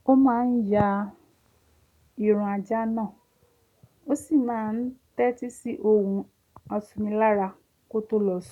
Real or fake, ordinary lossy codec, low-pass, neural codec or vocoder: real; none; 19.8 kHz; none